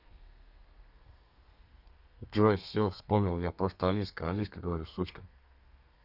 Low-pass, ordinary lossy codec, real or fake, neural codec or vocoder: 5.4 kHz; none; fake; codec, 32 kHz, 1.9 kbps, SNAC